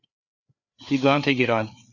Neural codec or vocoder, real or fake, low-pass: codec, 16 kHz, 4 kbps, FreqCodec, larger model; fake; 7.2 kHz